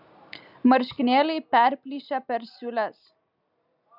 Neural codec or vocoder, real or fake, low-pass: none; real; 5.4 kHz